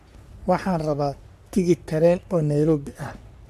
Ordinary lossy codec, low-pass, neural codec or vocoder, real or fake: MP3, 96 kbps; 14.4 kHz; codec, 44.1 kHz, 3.4 kbps, Pupu-Codec; fake